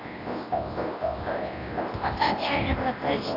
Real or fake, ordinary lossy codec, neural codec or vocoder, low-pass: fake; AAC, 24 kbps; codec, 24 kHz, 0.9 kbps, WavTokenizer, large speech release; 5.4 kHz